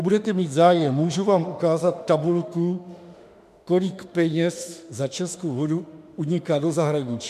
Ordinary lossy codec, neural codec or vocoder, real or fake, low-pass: MP3, 96 kbps; autoencoder, 48 kHz, 32 numbers a frame, DAC-VAE, trained on Japanese speech; fake; 14.4 kHz